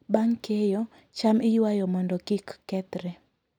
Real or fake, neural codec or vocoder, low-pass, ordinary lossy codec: real; none; 19.8 kHz; none